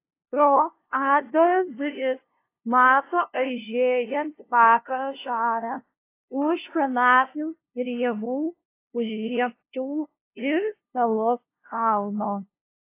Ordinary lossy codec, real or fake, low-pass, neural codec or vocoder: AAC, 24 kbps; fake; 3.6 kHz; codec, 16 kHz, 0.5 kbps, FunCodec, trained on LibriTTS, 25 frames a second